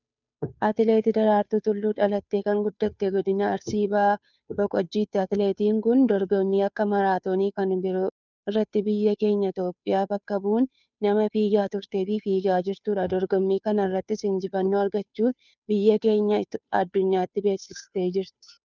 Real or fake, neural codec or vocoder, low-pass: fake; codec, 16 kHz, 2 kbps, FunCodec, trained on Chinese and English, 25 frames a second; 7.2 kHz